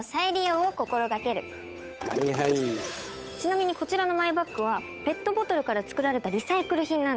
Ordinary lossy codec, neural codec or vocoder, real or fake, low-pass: none; codec, 16 kHz, 8 kbps, FunCodec, trained on Chinese and English, 25 frames a second; fake; none